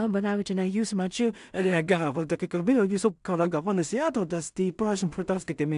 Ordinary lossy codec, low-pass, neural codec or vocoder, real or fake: AAC, 96 kbps; 10.8 kHz; codec, 16 kHz in and 24 kHz out, 0.4 kbps, LongCat-Audio-Codec, two codebook decoder; fake